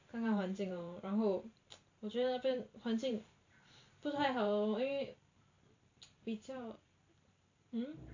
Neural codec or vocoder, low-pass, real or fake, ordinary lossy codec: vocoder, 44.1 kHz, 128 mel bands every 512 samples, BigVGAN v2; 7.2 kHz; fake; AAC, 48 kbps